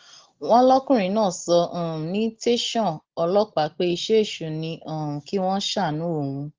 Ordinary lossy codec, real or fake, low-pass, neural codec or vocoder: Opus, 16 kbps; real; 7.2 kHz; none